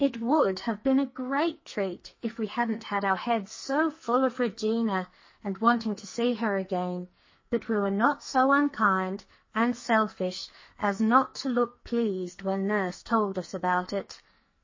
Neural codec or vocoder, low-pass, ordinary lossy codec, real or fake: codec, 44.1 kHz, 2.6 kbps, SNAC; 7.2 kHz; MP3, 32 kbps; fake